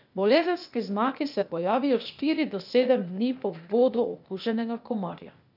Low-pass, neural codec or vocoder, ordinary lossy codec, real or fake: 5.4 kHz; codec, 16 kHz, 0.8 kbps, ZipCodec; none; fake